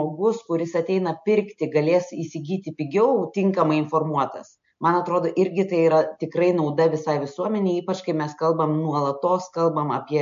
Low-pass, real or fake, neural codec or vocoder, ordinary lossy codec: 7.2 kHz; real; none; MP3, 48 kbps